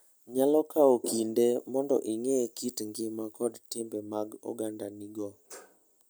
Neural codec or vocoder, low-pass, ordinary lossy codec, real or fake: none; none; none; real